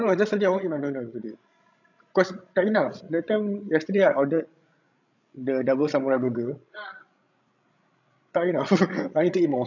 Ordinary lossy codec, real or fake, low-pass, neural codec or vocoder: none; fake; 7.2 kHz; codec, 16 kHz, 16 kbps, FreqCodec, larger model